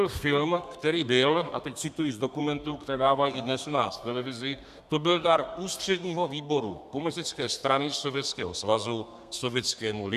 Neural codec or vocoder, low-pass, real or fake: codec, 32 kHz, 1.9 kbps, SNAC; 14.4 kHz; fake